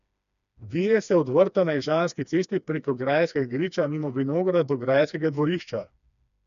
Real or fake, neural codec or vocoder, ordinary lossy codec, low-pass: fake; codec, 16 kHz, 2 kbps, FreqCodec, smaller model; none; 7.2 kHz